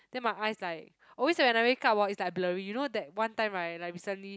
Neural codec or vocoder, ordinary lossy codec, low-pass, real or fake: none; none; none; real